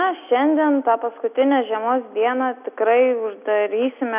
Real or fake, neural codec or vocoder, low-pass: real; none; 3.6 kHz